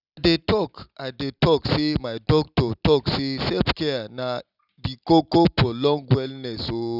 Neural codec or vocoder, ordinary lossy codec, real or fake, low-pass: none; none; real; 5.4 kHz